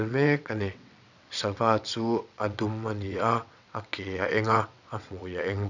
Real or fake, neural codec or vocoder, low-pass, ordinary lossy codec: fake; vocoder, 22.05 kHz, 80 mel bands, WaveNeXt; 7.2 kHz; none